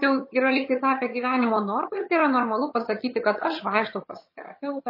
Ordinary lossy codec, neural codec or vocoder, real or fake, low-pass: MP3, 24 kbps; vocoder, 22.05 kHz, 80 mel bands, HiFi-GAN; fake; 5.4 kHz